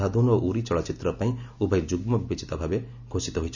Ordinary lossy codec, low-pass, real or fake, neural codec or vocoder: none; 7.2 kHz; real; none